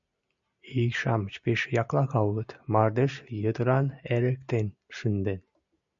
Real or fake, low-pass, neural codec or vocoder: real; 7.2 kHz; none